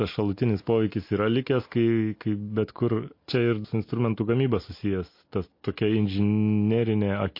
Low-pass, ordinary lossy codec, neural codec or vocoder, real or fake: 5.4 kHz; MP3, 32 kbps; none; real